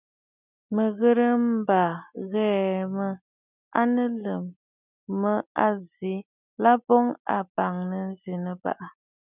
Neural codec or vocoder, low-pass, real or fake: none; 3.6 kHz; real